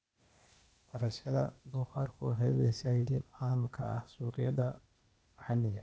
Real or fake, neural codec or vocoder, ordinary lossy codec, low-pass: fake; codec, 16 kHz, 0.8 kbps, ZipCodec; none; none